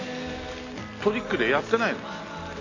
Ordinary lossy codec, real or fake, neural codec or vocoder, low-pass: AAC, 32 kbps; real; none; 7.2 kHz